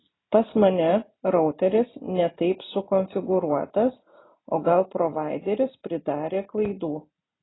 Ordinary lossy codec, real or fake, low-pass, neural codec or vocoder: AAC, 16 kbps; real; 7.2 kHz; none